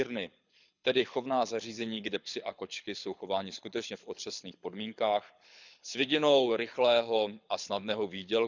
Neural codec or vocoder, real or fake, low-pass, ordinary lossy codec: codec, 24 kHz, 6 kbps, HILCodec; fake; 7.2 kHz; none